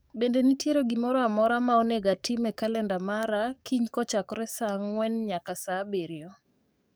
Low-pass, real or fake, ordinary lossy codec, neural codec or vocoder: none; fake; none; codec, 44.1 kHz, 7.8 kbps, DAC